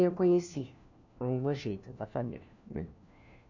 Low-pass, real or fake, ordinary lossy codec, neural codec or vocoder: 7.2 kHz; fake; none; codec, 16 kHz, 1 kbps, FunCodec, trained on LibriTTS, 50 frames a second